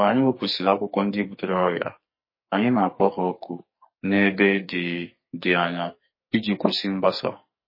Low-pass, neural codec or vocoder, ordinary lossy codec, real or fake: 5.4 kHz; codec, 44.1 kHz, 2.6 kbps, SNAC; MP3, 24 kbps; fake